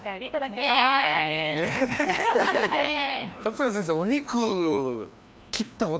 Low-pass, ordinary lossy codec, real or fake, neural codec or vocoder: none; none; fake; codec, 16 kHz, 1 kbps, FreqCodec, larger model